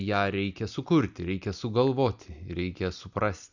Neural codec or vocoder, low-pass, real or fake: none; 7.2 kHz; real